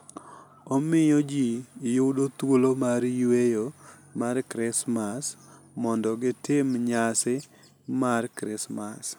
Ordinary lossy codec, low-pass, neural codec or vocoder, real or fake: none; none; none; real